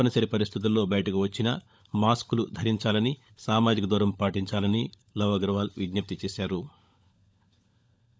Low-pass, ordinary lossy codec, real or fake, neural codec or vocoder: none; none; fake; codec, 16 kHz, 16 kbps, FunCodec, trained on LibriTTS, 50 frames a second